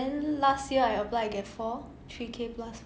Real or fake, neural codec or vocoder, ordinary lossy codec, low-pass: real; none; none; none